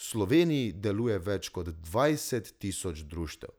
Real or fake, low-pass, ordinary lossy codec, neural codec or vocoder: real; none; none; none